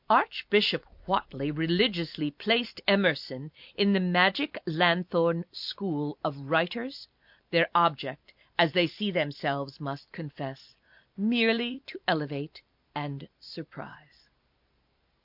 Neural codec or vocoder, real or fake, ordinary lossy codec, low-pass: vocoder, 44.1 kHz, 128 mel bands every 256 samples, BigVGAN v2; fake; MP3, 48 kbps; 5.4 kHz